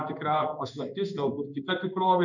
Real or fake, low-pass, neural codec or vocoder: fake; 7.2 kHz; codec, 16 kHz in and 24 kHz out, 1 kbps, XY-Tokenizer